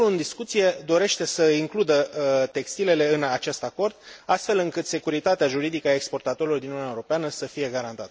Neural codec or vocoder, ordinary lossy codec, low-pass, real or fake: none; none; none; real